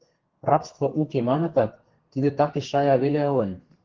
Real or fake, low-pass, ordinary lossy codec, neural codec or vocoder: fake; 7.2 kHz; Opus, 16 kbps; codec, 32 kHz, 1.9 kbps, SNAC